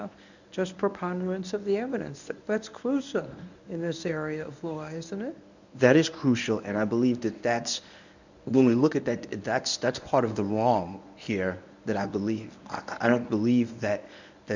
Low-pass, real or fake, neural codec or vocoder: 7.2 kHz; fake; codec, 24 kHz, 0.9 kbps, WavTokenizer, medium speech release version 1